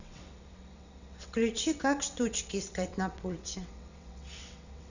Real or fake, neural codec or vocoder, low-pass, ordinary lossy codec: fake; vocoder, 22.05 kHz, 80 mel bands, WaveNeXt; 7.2 kHz; none